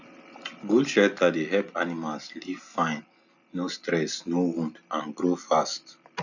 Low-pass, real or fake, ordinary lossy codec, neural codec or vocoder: 7.2 kHz; real; none; none